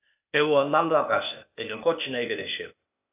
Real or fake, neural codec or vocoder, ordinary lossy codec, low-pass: fake; codec, 16 kHz, 0.8 kbps, ZipCodec; AAC, 32 kbps; 3.6 kHz